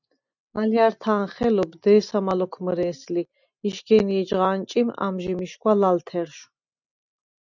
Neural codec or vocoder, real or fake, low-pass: none; real; 7.2 kHz